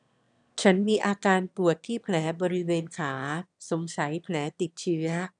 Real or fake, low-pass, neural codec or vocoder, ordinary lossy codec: fake; 9.9 kHz; autoencoder, 22.05 kHz, a latent of 192 numbers a frame, VITS, trained on one speaker; none